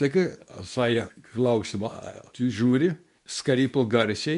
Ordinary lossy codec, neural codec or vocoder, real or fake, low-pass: MP3, 64 kbps; codec, 24 kHz, 0.9 kbps, WavTokenizer, medium speech release version 2; fake; 10.8 kHz